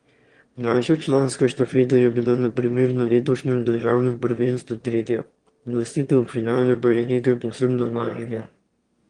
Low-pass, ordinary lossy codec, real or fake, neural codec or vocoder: 9.9 kHz; Opus, 24 kbps; fake; autoencoder, 22.05 kHz, a latent of 192 numbers a frame, VITS, trained on one speaker